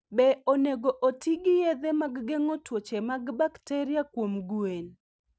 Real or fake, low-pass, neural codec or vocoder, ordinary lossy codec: real; none; none; none